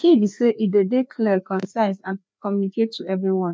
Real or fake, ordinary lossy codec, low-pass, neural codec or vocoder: fake; none; none; codec, 16 kHz, 2 kbps, FreqCodec, larger model